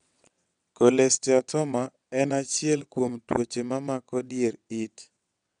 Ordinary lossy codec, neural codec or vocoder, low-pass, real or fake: none; vocoder, 22.05 kHz, 80 mel bands, WaveNeXt; 9.9 kHz; fake